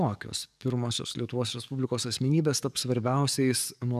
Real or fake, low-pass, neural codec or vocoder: fake; 14.4 kHz; codec, 44.1 kHz, 7.8 kbps, DAC